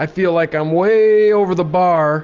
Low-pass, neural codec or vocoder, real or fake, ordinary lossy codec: 7.2 kHz; none; real; Opus, 32 kbps